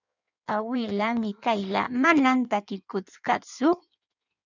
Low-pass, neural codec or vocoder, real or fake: 7.2 kHz; codec, 16 kHz in and 24 kHz out, 1.1 kbps, FireRedTTS-2 codec; fake